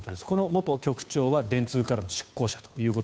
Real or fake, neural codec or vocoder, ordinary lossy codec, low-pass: fake; codec, 16 kHz, 2 kbps, FunCodec, trained on Chinese and English, 25 frames a second; none; none